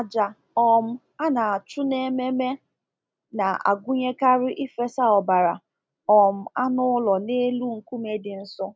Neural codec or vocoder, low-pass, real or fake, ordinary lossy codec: none; none; real; none